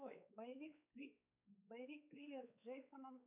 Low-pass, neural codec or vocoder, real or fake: 3.6 kHz; codec, 16 kHz, 4 kbps, X-Codec, WavLM features, trained on Multilingual LibriSpeech; fake